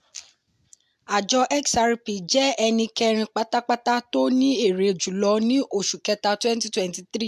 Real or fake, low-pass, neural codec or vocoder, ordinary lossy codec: real; 14.4 kHz; none; AAC, 96 kbps